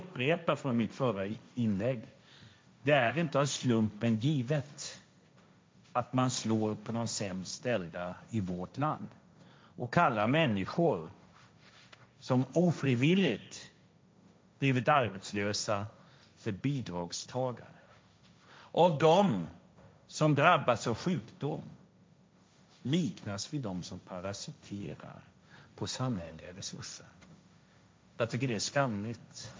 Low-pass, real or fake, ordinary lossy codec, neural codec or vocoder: none; fake; none; codec, 16 kHz, 1.1 kbps, Voila-Tokenizer